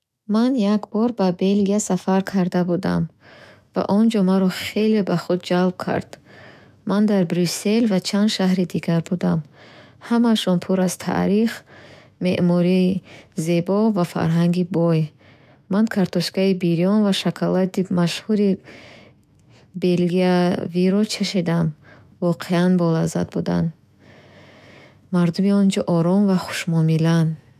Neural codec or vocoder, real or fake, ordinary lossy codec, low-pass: autoencoder, 48 kHz, 128 numbers a frame, DAC-VAE, trained on Japanese speech; fake; none; 14.4 kHz